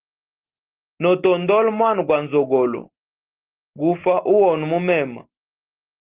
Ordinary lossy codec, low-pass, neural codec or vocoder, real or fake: Opus, 16 kbps; 3.6 kHz; none; real